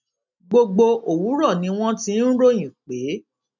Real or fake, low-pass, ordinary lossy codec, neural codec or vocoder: real; 7.2 kHz; none; none